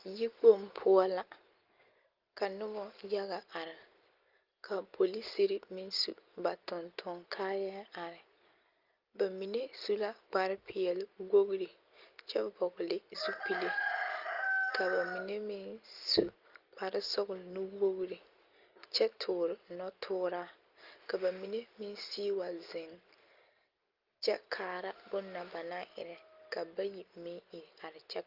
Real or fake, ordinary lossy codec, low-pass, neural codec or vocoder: real; Opus, 32 kbps; 5.4 kHz; none